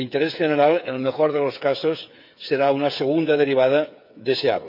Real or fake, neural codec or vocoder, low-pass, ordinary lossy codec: fake; codec, 16 kHz, 8 kbps, FreqCodec, smaller model; 5.4 kHz; none